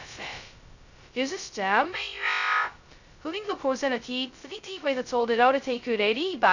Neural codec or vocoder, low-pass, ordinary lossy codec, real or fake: codec, 16 kHz, 0.2 kbps, FocalCodec; 7.2 kHz; none; fake